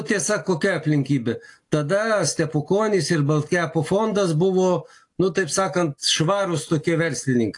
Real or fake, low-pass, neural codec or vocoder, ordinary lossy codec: real; 10.8 kHz; none; AAC, 48 kbps